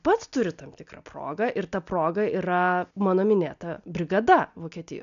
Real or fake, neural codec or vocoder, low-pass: real; none; 7.2 kHz